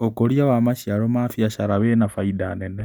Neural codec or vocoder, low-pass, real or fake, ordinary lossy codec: none; none; real; none